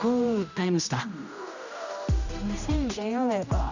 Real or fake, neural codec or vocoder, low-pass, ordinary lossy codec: fake; codec, 16 kHz, 1 kbps, X-Codec, HuBERT features, trained on general audio; 7.2 kHz; none